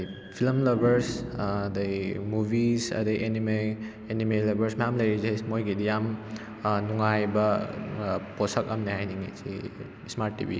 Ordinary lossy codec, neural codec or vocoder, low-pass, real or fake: none; none; none; real